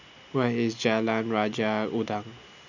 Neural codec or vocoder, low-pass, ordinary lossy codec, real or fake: none; 7.2 kHz; none; real